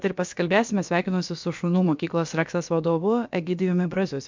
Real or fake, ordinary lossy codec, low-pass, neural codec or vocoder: fake; MP3, 64 kbps; 7.2 kHz; codec, 16 kHz, about 1 kbps, DyCAST, with the encoder's durations